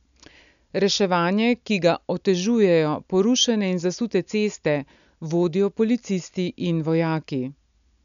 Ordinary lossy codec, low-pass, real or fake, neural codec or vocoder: none; 7.2 kHz; real; none